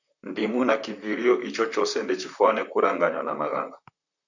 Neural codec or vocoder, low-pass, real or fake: vocoder, 44.1 kHz, 128 mel bands, Pupu-Vocoder; 7.2 kHz; fake